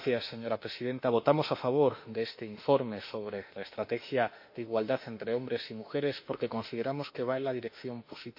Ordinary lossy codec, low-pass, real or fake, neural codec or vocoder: MP3, 32 kbps; 5.4 kHz; fake; autoencoder, 48 kHz, 32 numbers a frame, DAC-VAE, trained on Japanese speech